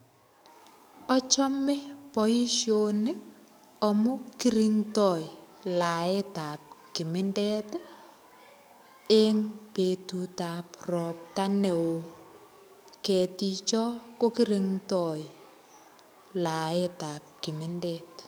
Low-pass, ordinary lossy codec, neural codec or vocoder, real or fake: none; none; codec, 44.1 kHz, 7.8 kbps, DAC; fake